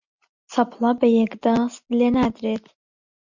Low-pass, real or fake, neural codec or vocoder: 7.2 kHz; real; none